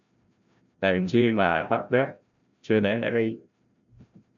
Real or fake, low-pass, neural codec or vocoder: fake; 7.2 kHz; codec, 16 kHz, 0.5 kbps, FreqCodec, larger model